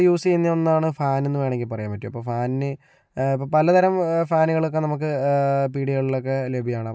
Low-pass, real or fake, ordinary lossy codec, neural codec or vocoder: none; real; none; none